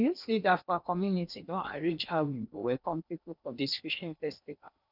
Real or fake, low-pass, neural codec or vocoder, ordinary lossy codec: fake; 5.4 kHz; codec, 16 kHz in and 24 kHz out, 0.8 kbps, FocalCodec, streaming, 65536 codes; none